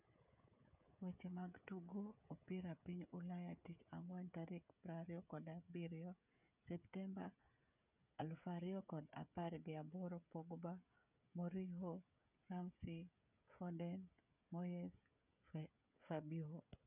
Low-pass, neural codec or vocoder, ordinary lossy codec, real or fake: 3.6 kHz; codec, 16 kHz, 16 kbps, FreqCodec, smaller model; none; fake